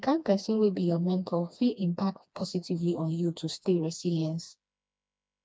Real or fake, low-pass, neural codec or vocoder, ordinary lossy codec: fake; none; codec, 16 kHz, 2 kbps, FreqCodec, smaller model; none